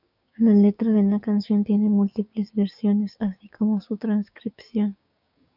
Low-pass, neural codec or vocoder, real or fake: 5.4 kHz; codec, 16 kHz, 6 kbps, DAC; fake